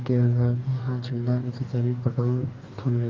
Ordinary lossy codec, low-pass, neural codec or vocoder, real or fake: Opus, 24 kbps; 7.2 kHz; codec, 44.1 kHz, 2.6 kbps, DAC; fake